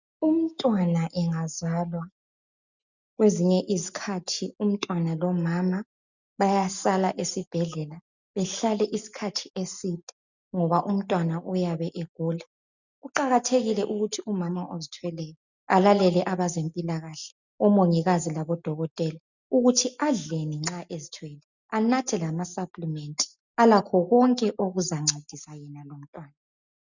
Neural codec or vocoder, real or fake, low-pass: none; real; 7.2 kHz